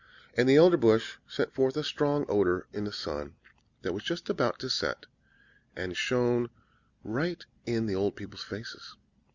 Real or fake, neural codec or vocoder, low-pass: real; none; 7.2 kHz